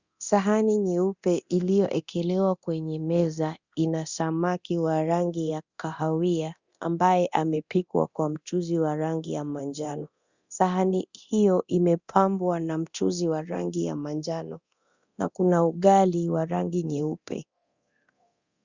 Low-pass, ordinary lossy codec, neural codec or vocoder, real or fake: 7.2 kHz; Opus, 64 kbps; codec, 24 kHz, 0.9 kbps, DualCodec; fake